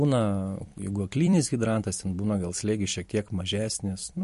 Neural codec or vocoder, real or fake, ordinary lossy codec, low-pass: vocoder, 44.1 kHz, 128 mel bands every 256 samples, BigVGAN v2; fake; MP3, 48 kbps; 14.4 kHz